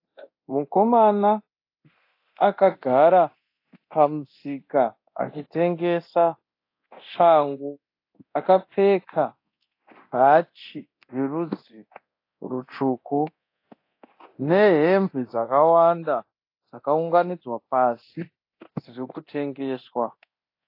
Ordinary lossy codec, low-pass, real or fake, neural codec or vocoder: AAC, 32 kbps; 5.4 kHz; fake; codec, 24 kHz, 0.9 kbps, DualCodec